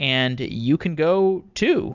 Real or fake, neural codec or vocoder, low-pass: real; none; 7.2 kHz